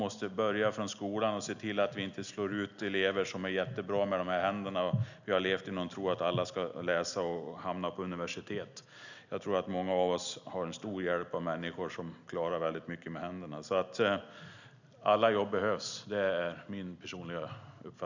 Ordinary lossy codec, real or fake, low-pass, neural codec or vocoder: none; real; 7.2 kHz; none